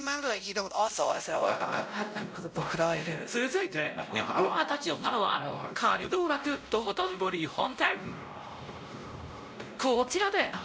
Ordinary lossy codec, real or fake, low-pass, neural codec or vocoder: none; fake; none; codec, 16 kHz, 0.5 kbps, X-Codec, WavLM features, trained on Multilingual LibriSpeech